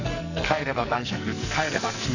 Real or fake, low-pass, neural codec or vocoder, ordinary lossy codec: fake; 7.2 kHz; codec, 44.1 kHz, 2.6 kbps, SNAC; none